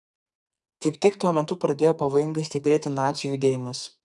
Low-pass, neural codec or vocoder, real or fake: 10.8 kHz; codec, 44.1 kHz, 2.6 kbps, SNAC; fake